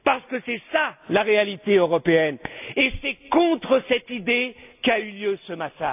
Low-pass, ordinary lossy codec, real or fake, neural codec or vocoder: 3.6 kHz; AAC, 32 kbps; real; none